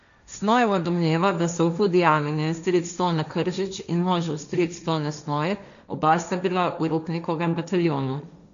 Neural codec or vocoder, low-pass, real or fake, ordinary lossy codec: codec, 16 kHz, 1.1 kbps, Voila-Tokenizer; 7.2 kHz; fake; none